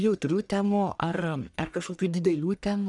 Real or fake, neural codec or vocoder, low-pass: fake; codec, 44.1 kHz, 1.7 kbps, Pupu-Codec; 10.8 kHz